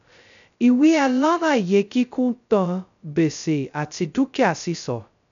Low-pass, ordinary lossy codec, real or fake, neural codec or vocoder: 7.2 kHz; MP3, 96 kbps; fake; codec, 16 kHz, 0.2 kbps, FocalCodec